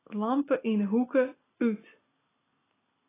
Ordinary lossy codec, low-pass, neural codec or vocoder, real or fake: AAC, 16 kbps; 3.6 kHz; none; real